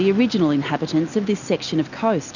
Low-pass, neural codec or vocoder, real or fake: 7.2 kHz; none; real